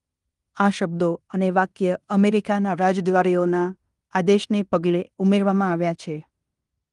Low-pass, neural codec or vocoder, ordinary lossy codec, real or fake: 10.8 kHz; codec, 16 kHz in and 24 kHz out, 0.9 kbps, LongCat-Audio-Codec, fine tuned four codebook decoder; Opus, 32 kbps; fake